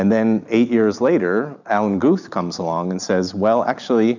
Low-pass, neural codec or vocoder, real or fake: 7.2 kHz; none; real